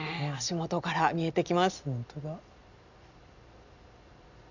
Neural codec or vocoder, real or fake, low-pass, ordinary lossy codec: none; real; 7.2 kHz; none